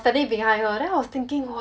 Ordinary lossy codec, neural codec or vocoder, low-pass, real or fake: none; none; none; real